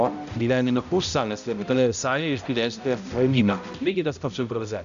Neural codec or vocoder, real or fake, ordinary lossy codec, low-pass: codec, 16 kHz, 0.5 kbps, X-Codec, HuBERT features, trained on balanced general audio; fake; AAC, 96 kbps; 7.2 kHz